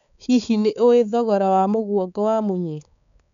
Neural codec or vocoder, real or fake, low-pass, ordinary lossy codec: codec, 16 kHz, 4 kbps, X-Codec, HuBERT features, trained on balanced general audio; fake; 7.2 kHz; none